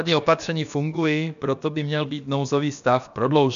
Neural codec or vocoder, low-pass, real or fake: codec, 16 kHz, about 1 kbps, DyCAST, with the encoder's durations; 7.2 kHz; fake